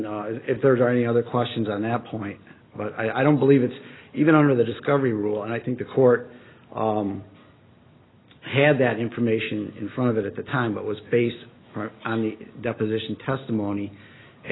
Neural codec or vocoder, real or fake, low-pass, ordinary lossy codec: none; real; 7.2 kHz; AAC, 16 kbps